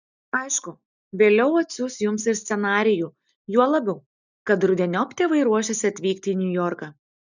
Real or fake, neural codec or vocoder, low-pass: real; none; 7.2 kHz